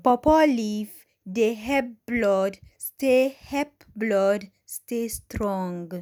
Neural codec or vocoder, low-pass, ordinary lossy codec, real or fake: none; none; none; real